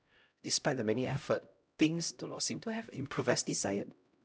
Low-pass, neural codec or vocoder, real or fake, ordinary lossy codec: none; codec, 16 kHz, 0.5 kbps, X-Codec, HuBERT features, trained on LibriSpeech; fake; none